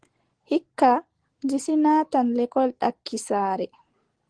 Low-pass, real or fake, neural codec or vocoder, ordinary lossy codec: 9.9 kHz; real; none; Opus, 16 kbps